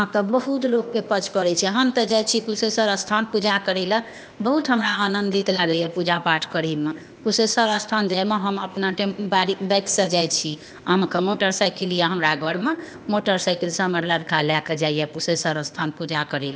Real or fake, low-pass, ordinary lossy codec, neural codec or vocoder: fake; none; none; codec, 16 kHz, 0.8 kbps, ZipCodec